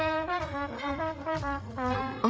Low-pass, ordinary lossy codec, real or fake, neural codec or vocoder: none; none; fake; codec, 16 kHz, 8 kbps, FreqCodec, larger model